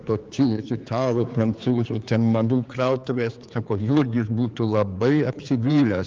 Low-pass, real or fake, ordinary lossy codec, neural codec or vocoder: 7.2 kHz; fake; Opus, 24 kbps; codec, 16 kHz, 4 kbps, X-Codec, HuBERT features, trained on general audio